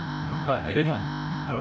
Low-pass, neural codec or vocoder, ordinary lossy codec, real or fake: none; codec, 16 kHz, 0.5 kbps, FreqCodec, larger model; none; fake